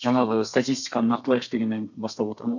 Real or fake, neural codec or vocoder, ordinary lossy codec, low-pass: fake; codec, 32 kHz, 1.9 kbps, SNAC; none; 7.2 kHz